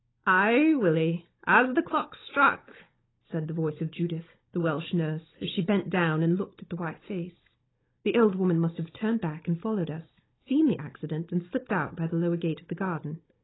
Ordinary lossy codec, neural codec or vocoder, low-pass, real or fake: AAC, 16 kbps; codec, 16 kHz, 16 kbps, FunCodec, trained on Chinese and English, 50 frames a second; 7.2 kHz; fake